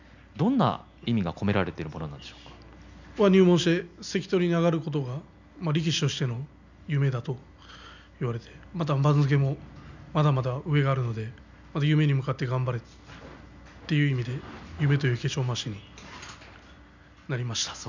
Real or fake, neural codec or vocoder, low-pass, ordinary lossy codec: real; none; 7.2 kHz; none